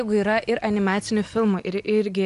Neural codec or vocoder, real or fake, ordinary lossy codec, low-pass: none; real; MP3, 96 kbps; 10.8 kHz